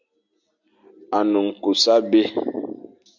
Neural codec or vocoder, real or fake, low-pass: none; real; 7.2 kHz